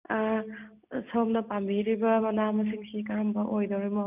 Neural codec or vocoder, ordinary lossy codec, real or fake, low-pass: none; none; real; 3.6 kHz